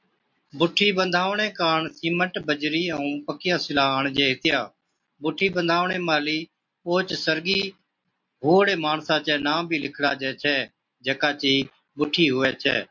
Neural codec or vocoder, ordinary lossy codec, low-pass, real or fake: none; MP3, 48 kbps; 7.2 kHz; real